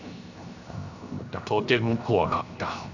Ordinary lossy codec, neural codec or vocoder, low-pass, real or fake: none; codec, 16 kHz, 0.7 kbps, FocalCodec; 7.2 kHz; fake